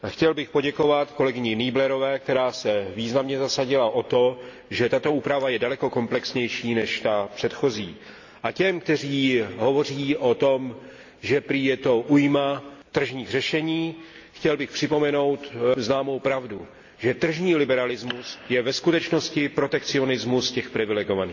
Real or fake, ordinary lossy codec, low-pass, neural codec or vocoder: real; AAC, 48 kbps; 7.2 kHz; none